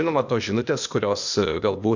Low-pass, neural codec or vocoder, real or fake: 7.2 kHz; codec, 16 kHz, 0.8 kbps, ZipCodec; fake